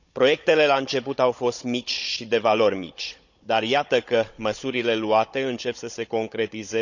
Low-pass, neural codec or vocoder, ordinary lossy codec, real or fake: 7.2 kHz; codec, 16 kHz, 16 kbps, FunCodec, trained on Chinese and English, 50 frames a second; none; fake